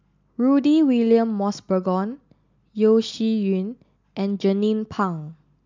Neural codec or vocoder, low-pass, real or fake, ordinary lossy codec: none; 7.2 kHz; real; MP3, 64 kbps